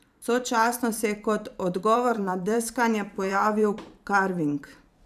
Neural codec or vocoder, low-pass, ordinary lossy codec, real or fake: vocoder, 44.1 kHz, 128 mel bands every 512 samples, BigVGAN v2; 14.4 kHz; none; fake